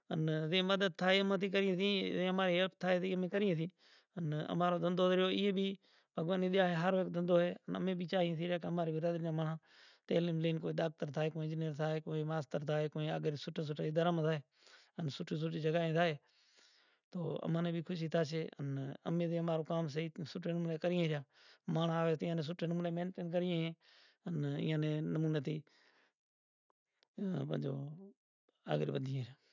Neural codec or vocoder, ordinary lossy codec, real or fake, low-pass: none; none; real; 7.2 kHz